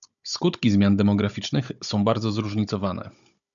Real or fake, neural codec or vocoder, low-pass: fake; codec, 16 kHz, 16 kbps, FunCodec, trained on Chinese and English, 50 frames a second; 7.2 kHz